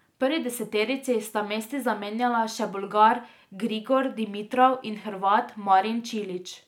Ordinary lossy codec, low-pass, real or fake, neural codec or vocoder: none; 19.8 kHz; real; none